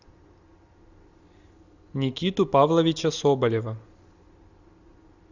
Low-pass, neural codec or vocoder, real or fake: 7.2 kHz; none; real